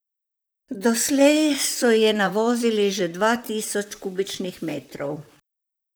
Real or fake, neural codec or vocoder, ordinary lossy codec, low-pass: fake; vocoder, 44.1 kHz, 128 mel bands, Pupu-Vocoder; none; none